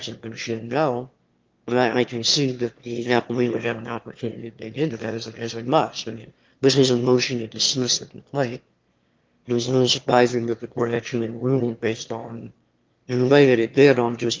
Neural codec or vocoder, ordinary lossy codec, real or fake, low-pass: autoencoder, 22.05 kHz, a latent of 192 numbers a frame, VITS, trained on one speaker; Opus, 32 kbps; fake; 7.2 kHz